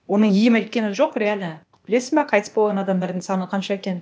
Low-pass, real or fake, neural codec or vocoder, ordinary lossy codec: none; fake; codec, 16 kHz, 0.8 kbps, ZipCodec; none